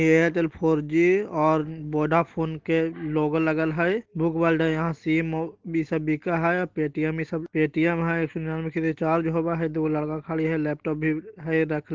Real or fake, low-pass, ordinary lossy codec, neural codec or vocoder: real; 7.2 kHz; Opus, 16 kbps; none